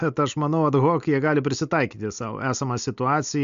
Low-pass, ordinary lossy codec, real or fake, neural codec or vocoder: 7.2 kHz; MP3, 64 kbps; real; none